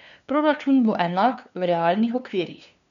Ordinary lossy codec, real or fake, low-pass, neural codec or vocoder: none; fake; 7.2 kHz; codec, 16 kHz, 2 kbps, FunCodec, trained on LibriTTS, 25 frames a second